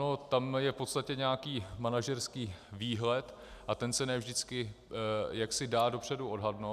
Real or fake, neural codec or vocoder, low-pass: real; none; 14.4 kHz